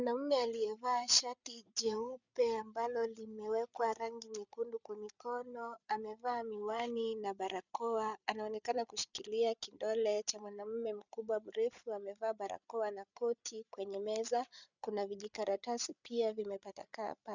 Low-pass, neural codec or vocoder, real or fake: 7.2 kHz; codec, 16 kHz, 8 kbps, FreqCodec, larger model; fake